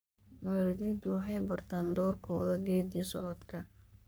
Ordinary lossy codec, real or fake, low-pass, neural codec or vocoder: none; fake; none; codec, 44.1 kHz, 3.4 kbps, Pupu-Codec